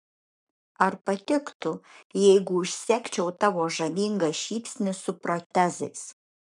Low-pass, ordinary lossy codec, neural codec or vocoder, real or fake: 10.8 kHz; MP3, 96 kbps; codec, 44.1 kHz, 7.8 kbps, Pupu-Codec; fake